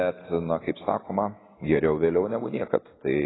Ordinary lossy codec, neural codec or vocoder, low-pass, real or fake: AAC, 16 kbps; none; 7.2 kHz; real